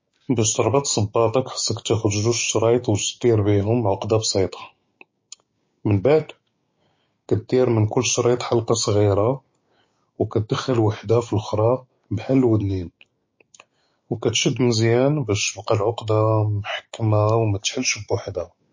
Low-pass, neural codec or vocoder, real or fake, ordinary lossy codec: 7.2 kHz; codec, 16 kHz, 6 kbps, DAC; fake; MP3, 32 kbps